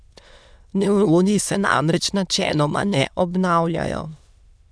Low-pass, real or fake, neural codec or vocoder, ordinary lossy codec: none; fake; autoencoder, 22.05 kHz, a latent of 192 numbers a frame, VITS, trained on many speakers; none